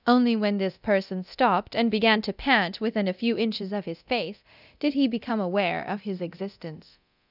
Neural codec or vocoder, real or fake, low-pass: codec, 24 kHz, 0.9 kbps, DualCodec; fake; 5.4 kHz